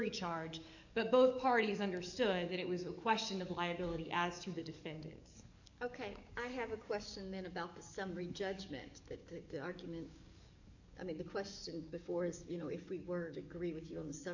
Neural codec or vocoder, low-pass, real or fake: codec, 44.1 kHz, 7.8 kbps, DAC; 7.2 kHz; fake